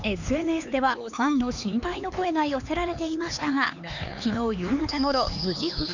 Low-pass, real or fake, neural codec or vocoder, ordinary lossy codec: 7.2 kHz; fake; codec, 16 kHz, 4 kbps, X-Codec, HuBERT features, trained on LibriSpeech; none